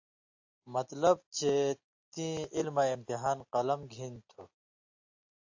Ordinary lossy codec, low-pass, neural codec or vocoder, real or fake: AAC, 48 kbps; 7.2 kHz; none; real